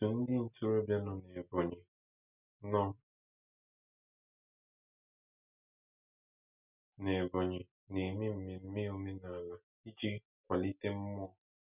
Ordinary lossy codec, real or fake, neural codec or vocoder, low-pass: none; real; none; 3.6 kHz